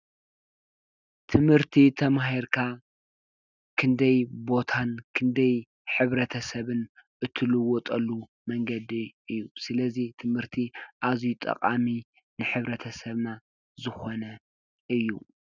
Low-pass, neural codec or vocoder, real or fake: 7.2 kHz; none; real